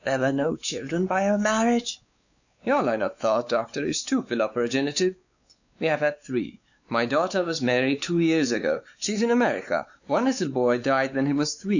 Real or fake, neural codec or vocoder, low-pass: fake; codec, 16 kHz, 4 kbps, X-Codec, WavLM features, trained on Multilingual LibriSpeech; 7.2 kHz